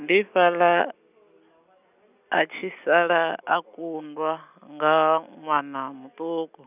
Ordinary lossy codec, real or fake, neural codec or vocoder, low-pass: none; real; none; 3.6 kHz